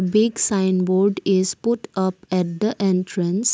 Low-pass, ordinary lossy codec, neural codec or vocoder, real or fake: none; none; none; real